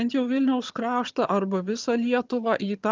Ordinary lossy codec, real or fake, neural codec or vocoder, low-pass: Opus, 24 kbps; fake; vocoder, 22.05 kHz, 80 mel bands, HiFi-GAN; 7.2 kHz